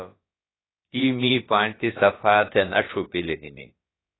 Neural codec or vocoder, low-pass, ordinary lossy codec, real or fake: codec, 16 kHz, about 1 kbps, DyCAST, with the encoder's durations; 7.2 kHz; AAC, 16 kbps; fake